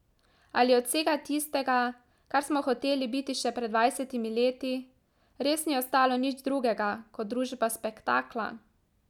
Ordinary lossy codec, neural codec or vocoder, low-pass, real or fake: none; none; 19.8 kHz; real